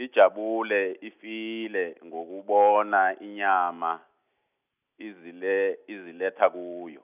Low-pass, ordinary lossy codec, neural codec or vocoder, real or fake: 3.6 kHz; none; none; real